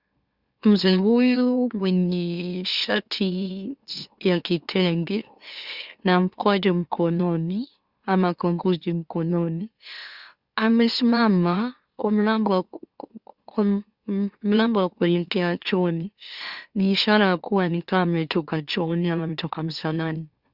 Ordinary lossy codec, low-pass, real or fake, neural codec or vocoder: Opus, 64 kbps; 5.4 kHz; fake; autoencoder, 44.1 kHz, a latent of 192 numbers a frame, MeloTTS